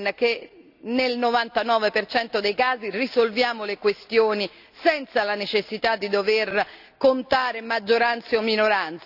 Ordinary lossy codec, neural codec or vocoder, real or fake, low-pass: none; none; real; 5.4 kHz